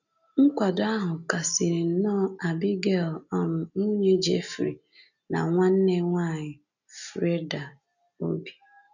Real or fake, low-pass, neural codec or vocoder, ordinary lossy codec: real; 7.2 kHz; none; none